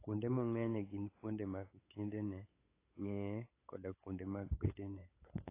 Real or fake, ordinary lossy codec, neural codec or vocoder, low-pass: fake; AAC, 24 kbps; codec, 16 kHz, 8 kbps, FunCodec, trained on LibriTTS, 25 frames a second; 3.6 kHz